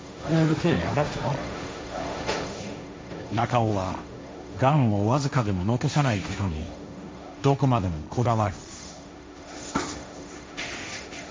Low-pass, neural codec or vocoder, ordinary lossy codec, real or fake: none; codec, 16 kHz, 1.1 kbps, Voila-Tokenizer; none; fake